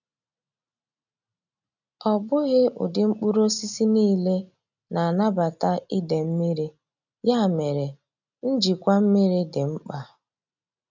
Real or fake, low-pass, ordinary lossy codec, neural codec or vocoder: real; 7.2 kHz; none; none